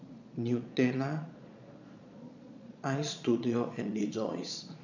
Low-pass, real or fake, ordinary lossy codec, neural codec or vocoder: 7.2 kHz; fake; none; vocoder, 22.05 kHz, 80 mel bands, Vocos